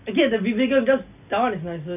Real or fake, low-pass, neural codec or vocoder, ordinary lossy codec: real; 3.6 kHz; none; none